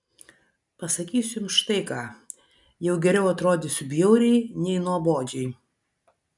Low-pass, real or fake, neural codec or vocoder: 10.8 kHz; real; none